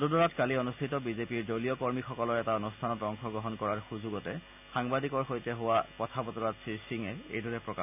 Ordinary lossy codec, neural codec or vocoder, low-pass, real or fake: none; none; 3.6 kHz; real